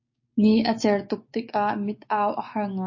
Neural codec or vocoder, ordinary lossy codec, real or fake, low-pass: codec, 16 kHz, 6 kbps, DAC; MP3, 32 kbps; fake; 7.2 kHz